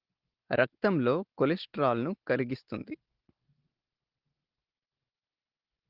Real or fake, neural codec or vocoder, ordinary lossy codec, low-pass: real; none; Opus, 16 kbps; 5.4 kHz